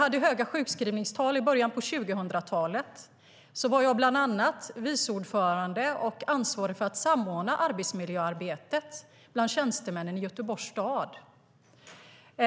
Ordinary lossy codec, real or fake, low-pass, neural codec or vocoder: none; real; none; none